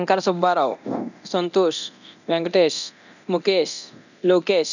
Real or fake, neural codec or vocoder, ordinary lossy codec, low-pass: fake; codec, 24 kHz, 0.9 kbps, DualCodec; none; 7.2 kHz